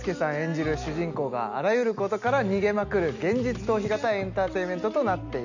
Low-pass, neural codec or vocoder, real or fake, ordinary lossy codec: 7.2 kHz; none; real; none